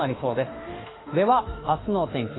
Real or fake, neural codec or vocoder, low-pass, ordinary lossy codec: fake; autoencoder, 48 kHz, 32 numbers a frame, DAC-VAE, trained on Japanese speech; 7.2 kHz; AAC, 16 kbps